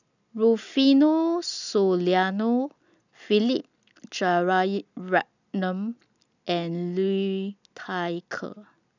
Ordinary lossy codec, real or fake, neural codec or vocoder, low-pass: none; real; none; 7.2 kHz